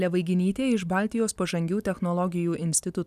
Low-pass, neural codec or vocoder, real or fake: 14.4 kHz; none; real